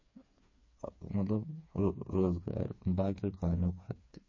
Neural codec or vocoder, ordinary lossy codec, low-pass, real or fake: codec, 16 kHz, 4 kbps, FreqCodec, smaller model; MP3, 32 kbps; 7.2 kHz; fake